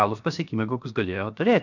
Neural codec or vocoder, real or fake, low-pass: codec, 16 kHz, 0.7 kbps, FocalCodec; fake; 7.2 kHz